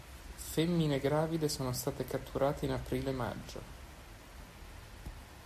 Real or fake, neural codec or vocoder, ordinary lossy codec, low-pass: real; none; MP3, 64 kbps; 14.4 kHz